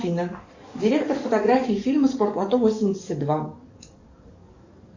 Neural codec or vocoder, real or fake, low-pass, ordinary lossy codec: codec, 44.1 kHz, 7.8 kbps, DAC; fake; 7.2 kHz; Opus, 64 kbps